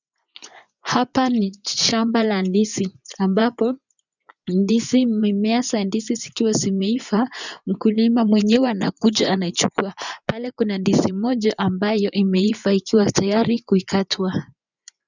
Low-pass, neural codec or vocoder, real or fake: 7.2 kHz; vocoder, 24 kHz, 100 mel bands, Vocos; fake